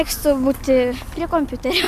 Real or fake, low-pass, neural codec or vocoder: real; 14.4 kHz; none